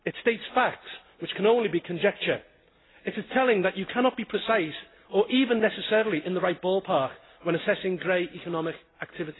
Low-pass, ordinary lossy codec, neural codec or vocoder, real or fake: 7.2 kHz; AAC, 16 kbps; none; real